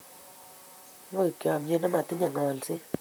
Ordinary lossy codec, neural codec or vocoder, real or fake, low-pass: none; vocoder, 44.1 kHz, 128 mel bands, Pupu-Vocoder; fake; none